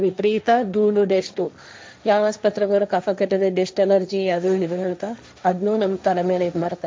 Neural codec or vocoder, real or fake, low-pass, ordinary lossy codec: codec, 16 kHz, 1.1 kbps, Voila-Tokenizer; fake; none; none